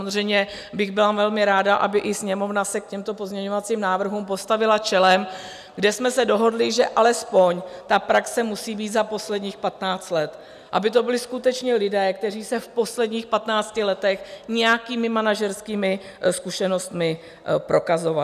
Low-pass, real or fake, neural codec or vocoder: 14.4 kHz; real; none